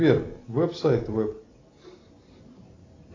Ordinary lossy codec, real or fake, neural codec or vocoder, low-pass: AAC, 32 kbps; real; none; 7.2 kHz